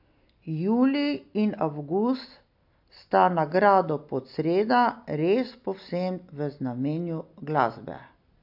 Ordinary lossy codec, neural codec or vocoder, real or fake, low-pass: AAC, 48 kbps; none; real; 5.4 kHz